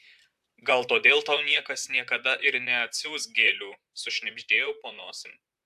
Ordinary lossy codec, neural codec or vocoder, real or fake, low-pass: AAC, 96 kbps; vocoder, 44.1 kHz, 128 mel bands, Pupu-Vocoder; fake; 14.4 kHz